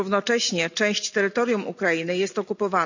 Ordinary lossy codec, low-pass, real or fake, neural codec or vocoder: none; 7.2 kHz; real; none